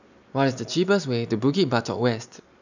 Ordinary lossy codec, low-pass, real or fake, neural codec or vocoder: none; 7.2 kHz; real; none